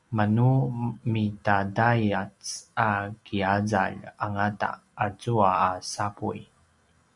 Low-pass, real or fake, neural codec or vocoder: 10.8 kHz; real; none